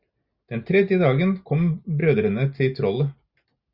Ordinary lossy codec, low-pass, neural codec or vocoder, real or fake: Opus, 64 kbps; 5.4 kHz; none; real